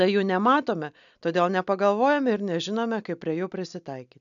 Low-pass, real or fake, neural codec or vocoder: 7.2 kHz; real; none